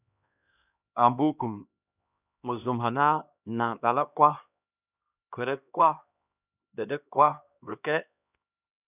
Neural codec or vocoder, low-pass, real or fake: codec, 16 kHz, 1 kbps, X-Codec, HuBERT features, trained on LibriSpeech; 3.6 kHz; fake